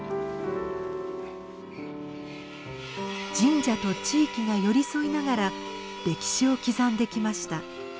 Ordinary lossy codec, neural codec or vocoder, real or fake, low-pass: none; none; real; none